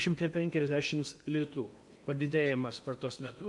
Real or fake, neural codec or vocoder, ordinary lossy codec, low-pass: fake; codec, 16 kHz in and 24 kHz out, 0.8 kbps, FocalCodec, streaming, 65536 codes; MP3, 64 kbps; 10.8 kHz